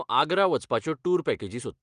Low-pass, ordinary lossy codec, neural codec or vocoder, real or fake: 9.9 kHz; Opus, 32 kbps; none; real